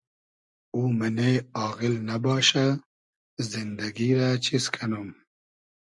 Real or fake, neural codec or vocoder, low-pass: real; none; 10.8 kHz